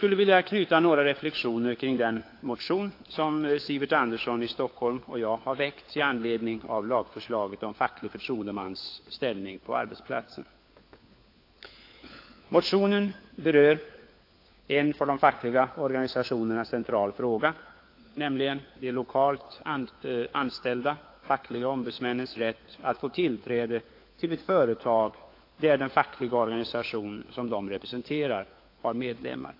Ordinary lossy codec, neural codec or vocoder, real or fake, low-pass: AAC, 32 kbps; codec, 16 kHz, 4 kbps, FunCodec, trained on LibriTTS, 50 frames a second; fake; 5.4 kHz